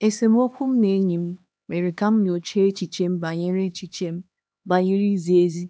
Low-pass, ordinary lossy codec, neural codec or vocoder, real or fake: none; none; codec, 16 kHz, 2 kbps, X-Codec, HuBERT features, trained on LibriSpeech; fake